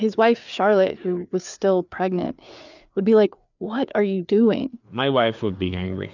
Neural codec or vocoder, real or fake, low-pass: codec, 16 kHz, 4 kbps, FreqCodec, larger model; fake; 7.2 kHz